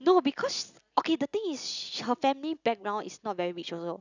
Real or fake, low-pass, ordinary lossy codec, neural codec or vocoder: real; 7.2 kHz; none; none